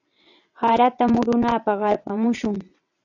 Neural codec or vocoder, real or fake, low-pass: vocoder, 22.05 kHz, 80 mel bands, WaveNeXt; fake; 7.2 kHz